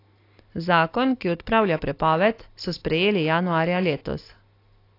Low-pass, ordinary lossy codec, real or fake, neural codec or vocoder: 5.4 kHz; AAC, 32 kbps; real; none